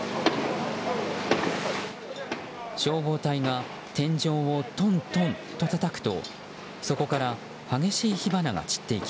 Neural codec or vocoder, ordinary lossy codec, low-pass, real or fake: none; none; none; real